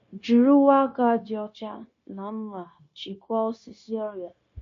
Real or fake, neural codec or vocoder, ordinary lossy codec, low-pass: fake; codec, 16 kHz, 0.9 kbps, LongCat-Audio-Codec; MP3, 48 kbps; 7.2 kHz